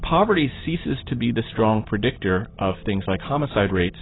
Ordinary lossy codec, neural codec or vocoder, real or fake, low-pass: AAC, 16 kbps; none; real; 7.2 kHz